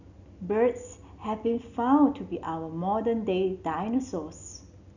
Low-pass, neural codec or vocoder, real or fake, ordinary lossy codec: 7.2 kHz; none; real; none